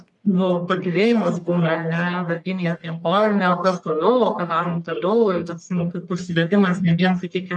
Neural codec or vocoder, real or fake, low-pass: codec, 44.1 kHz, 1.7 kbps, Pupu-Codec; fake; 10.8 kHz